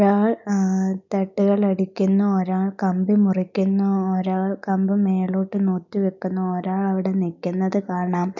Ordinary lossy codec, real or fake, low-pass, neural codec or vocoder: none; real; 7.2 kHz; none